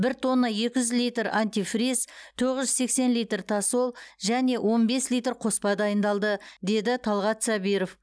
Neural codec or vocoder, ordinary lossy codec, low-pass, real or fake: none; none; none; real